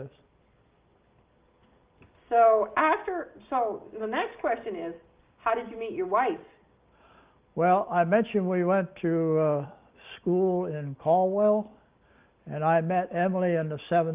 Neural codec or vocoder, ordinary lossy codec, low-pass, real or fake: none; Opus, 32 kbps; 3.6 kHz; real